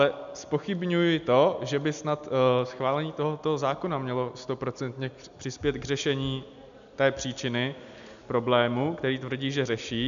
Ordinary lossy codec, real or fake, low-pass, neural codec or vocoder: AAC, 96 kbps; real; 7.2 kHz; none